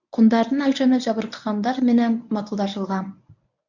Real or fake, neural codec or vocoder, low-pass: fake; codec, 24 kHz, 0.9 kbps, WavTokenizer, medium speech release version 1; 7.2 kHz